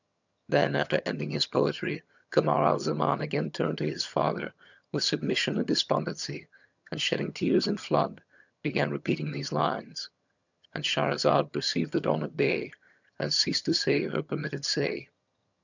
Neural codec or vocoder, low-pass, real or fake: vocoder, 22.05 kHz, 80 mel bands, HiFi-GAN; 7.2 kHz; fake